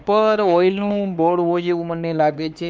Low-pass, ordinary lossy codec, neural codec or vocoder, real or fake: none; none; codec, 16 kHz, 2 kbps, X-Codec, HuBERT features, trained on LibriSpeech; fake